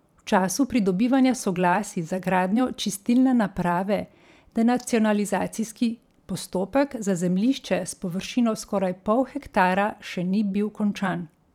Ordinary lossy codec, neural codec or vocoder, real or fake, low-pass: none; vocoder, 44.1 kHz, 128 mel bands every 512 samples, BigVGAN v2; fake; 19.8 kHz